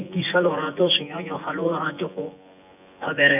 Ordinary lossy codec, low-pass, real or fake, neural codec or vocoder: none; 3.6 kHz; fake; vocoder, 24 kHz, 100 mel bands, Vocos